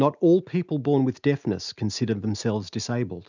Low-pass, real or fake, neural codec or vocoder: 7.2 kHz; real; none